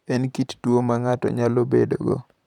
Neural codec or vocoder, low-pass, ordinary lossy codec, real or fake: vocoder, 44.1 kHz, 128 mel bands, Pupu-Vocoder; 19.8 kHz; none; fake